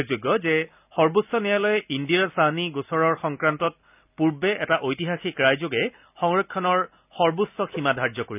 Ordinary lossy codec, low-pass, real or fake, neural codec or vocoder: none; 3.6 kHz; real; none